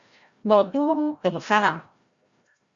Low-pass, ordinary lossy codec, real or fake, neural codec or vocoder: 7.2 kHz; Opus, 64 kbps; fake; codec, 16 kHz, 0.5 kbps, FreqCodec, larger model